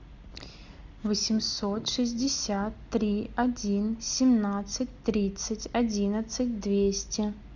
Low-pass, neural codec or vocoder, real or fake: 7.2 kHz; none; real